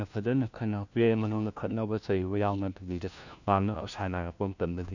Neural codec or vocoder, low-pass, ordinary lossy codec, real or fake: codec, 16 kHz, 1 kbps, FunCodec, trained on LibriTTS, 50 frames a second; 7.2 kHz; none; fake